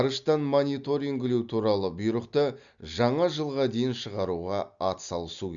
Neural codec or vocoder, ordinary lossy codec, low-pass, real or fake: none; Opus, 64 kbps; 7.2 kHz; real